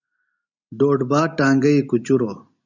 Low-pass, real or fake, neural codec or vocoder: 7.2 kHz; real; none